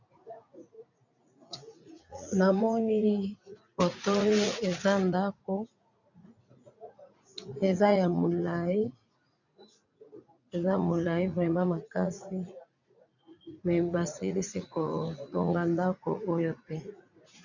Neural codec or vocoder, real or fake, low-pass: vocoder, 44.1 kHz, 80 mel bands, Vocos; fake; 7.2 kHz